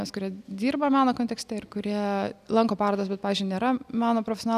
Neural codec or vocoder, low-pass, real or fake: none; 14.4 kHz; real